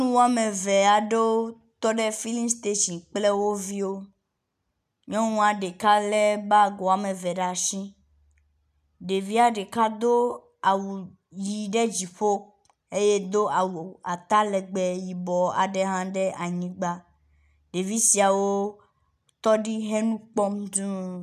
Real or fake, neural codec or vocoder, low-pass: real; none; 14.4 kHz